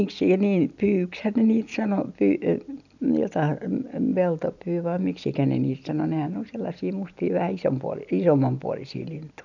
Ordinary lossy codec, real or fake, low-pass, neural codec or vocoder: none; real; 7.2 kHz; none